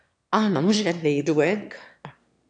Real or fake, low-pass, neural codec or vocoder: fake; 9.9 kHz; autoencoder, 22.05 kHz, a latent of 192 numbers a frame, VITS, trained on one speaker